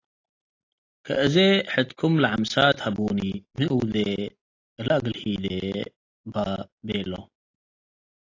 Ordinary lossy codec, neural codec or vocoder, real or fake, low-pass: AAC, 32 kbps; none; real; 7.2 kHz